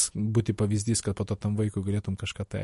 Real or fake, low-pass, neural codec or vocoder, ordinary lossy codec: real; 14.4 kHz; none; MP3, 48 kbps